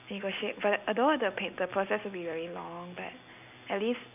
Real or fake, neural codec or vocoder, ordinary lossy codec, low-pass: real; none; none; 3.6 kHz